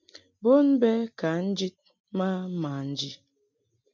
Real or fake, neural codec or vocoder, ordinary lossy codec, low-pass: real; none; MP3, 64 kbps; 7.2 kHz